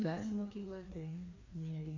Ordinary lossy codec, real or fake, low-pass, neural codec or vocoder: AAC, 32 kbps; fake; 7.2 kHz; codec, 16 kHz, 2 kbps, FreqCodec, larger model